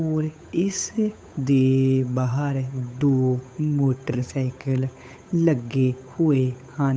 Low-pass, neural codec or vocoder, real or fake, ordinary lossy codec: none; codec, 16 kHz, 8 kbps, FunCodec, trained on Chinese and English, 25 frames a second; fake; none